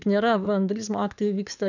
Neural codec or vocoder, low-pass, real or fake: codec, 44.1 kHz, 7.8 kbps, DAC; 7.2 kHz; fake